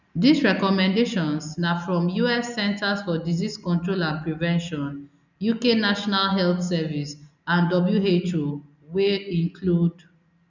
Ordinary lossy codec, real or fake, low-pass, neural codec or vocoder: none; real; 7.2 kHz; none